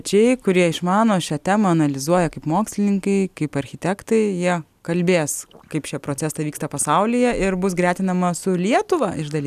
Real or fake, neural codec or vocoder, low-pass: real; none; 14.4 kHz